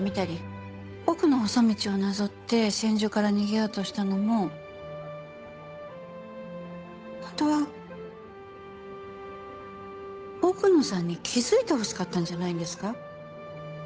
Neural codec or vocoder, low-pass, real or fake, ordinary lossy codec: codec, 16 kHz, 8 kbps, FunCodec, trained on Chinese and English, 25 frames a second; none; fake; none